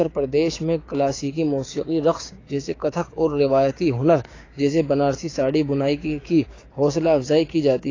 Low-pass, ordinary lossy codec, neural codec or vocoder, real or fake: 7.2 kHz; AAC, 32 kbps; codec, 16 kHz, 6 kbps, DAC; fake